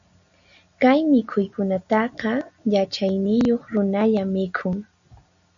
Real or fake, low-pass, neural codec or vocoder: real; 7.2 kHz; none